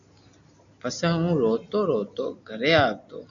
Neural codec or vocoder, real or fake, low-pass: none; real; 7.2 kHz